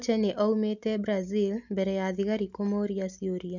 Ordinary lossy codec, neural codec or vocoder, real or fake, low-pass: none; none; real; 7.2 kHz